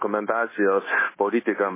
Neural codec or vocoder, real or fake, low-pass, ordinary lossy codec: codec, 16 kHz, 0.9 kbps, LongCat-Audio-Codec; fake; 3.6 kHz; MP3, 16 kbps